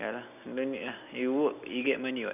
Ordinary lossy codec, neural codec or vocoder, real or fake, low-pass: none; none; real; 3.6 kHz